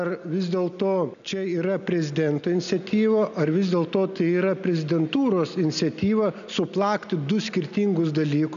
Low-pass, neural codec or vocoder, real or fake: 7.2 kHz; none; real